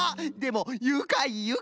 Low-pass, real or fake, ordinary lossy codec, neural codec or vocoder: none; real; none; none